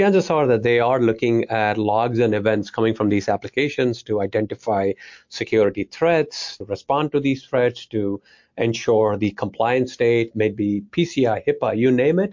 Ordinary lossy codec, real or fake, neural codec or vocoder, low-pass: MP3, 48 kbps; real; none; 7.2 kHz